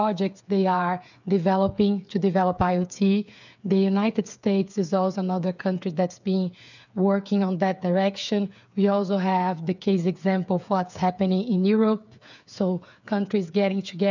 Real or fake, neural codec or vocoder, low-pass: fake; codec, 16 kHz, 8 kbps, FreqCodec, smaller model; 7.2 kHz